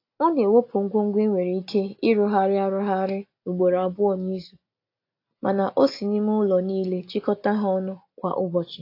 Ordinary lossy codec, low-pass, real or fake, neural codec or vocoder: AAC, 32 kbps; 5.4 kHz; real; none